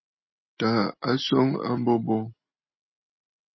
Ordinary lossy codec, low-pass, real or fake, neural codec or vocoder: MP3, 24 kbps; 7.2 kHz; real; none